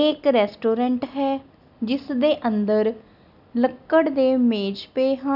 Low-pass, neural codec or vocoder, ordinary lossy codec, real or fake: 5.4 kHz; none; none; real